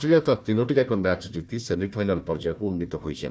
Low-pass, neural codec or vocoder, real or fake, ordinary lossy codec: none; codec, 16 kHz, 1 kbps, FunCodec, trained on Chinese and English, 50 frames a second; fake; none